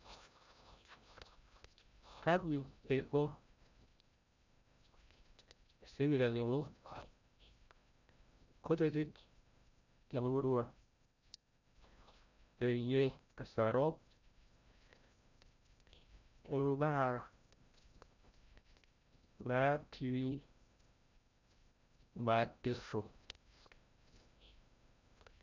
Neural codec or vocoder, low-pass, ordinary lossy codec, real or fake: codec, 16 kHz, 0.5 kbps, FreqCodec, larger model; 7.2 kHz; MP3, 64 kbps; fake